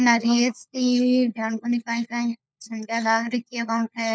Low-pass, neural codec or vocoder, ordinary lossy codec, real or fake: none; codec, 16 kHz, 4 kbps, FunCodec, trained on LibriTTS, 50 frames a second; none; fake